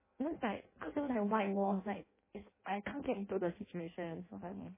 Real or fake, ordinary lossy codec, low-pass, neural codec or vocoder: fake; MP3, 16 kbps; 3.6 kHz; codec, 16 kHz in and 24 kHz out, 0.6 kbps, FireRedTTS-2 codec